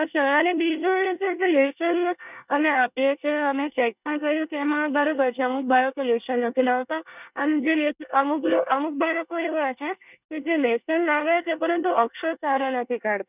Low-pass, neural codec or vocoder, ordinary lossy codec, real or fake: 3.6 kHz; codec, 24 kHz, 1 kbps, SNAC; none; fake